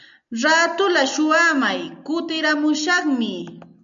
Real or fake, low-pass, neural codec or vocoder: real; 7.2 kHz; none